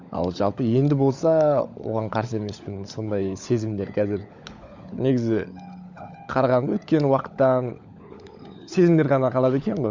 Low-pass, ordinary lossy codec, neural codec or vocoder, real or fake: 7.2 kHz; Opus, 64 kbps; codec, 16 kHz, 16 kbps, FunCodec, trained on LibriTTS, 50 frames a second; fake